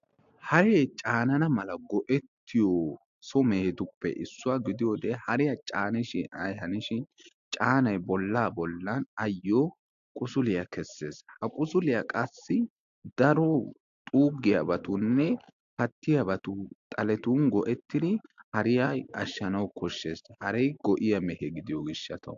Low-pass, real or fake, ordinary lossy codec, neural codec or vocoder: 7.2 kHz; real; AAC, 64 kbps; none